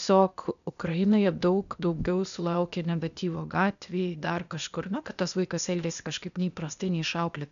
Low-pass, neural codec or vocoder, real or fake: 7.2 kHz; codec, 16 kHz, 0.8 kbps, ZipCodec; fake